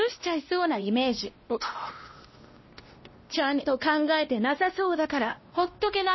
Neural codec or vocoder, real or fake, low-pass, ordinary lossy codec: codec, 16 kHz, 1 kbps, X-Codec, WavLM features, trained on Multilingual LibriSpeech; fake; 7.2 kHz; MP3, 24 kbps